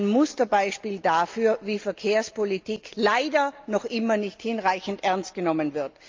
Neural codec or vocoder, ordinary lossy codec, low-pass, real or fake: none; Opus, 24 kbps; 7.2 kHz; real